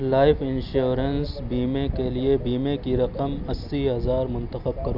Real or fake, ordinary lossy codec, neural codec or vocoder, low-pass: real; none; none; 5.4 kHz